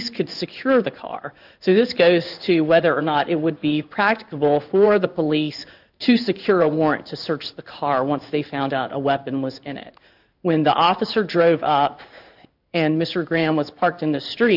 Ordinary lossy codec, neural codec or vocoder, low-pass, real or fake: AAC, 48 kbps; codec, 16 kHz in and 24 kHz out, 1 kbps, XY-Tokenizer; 5.4 kHz; fake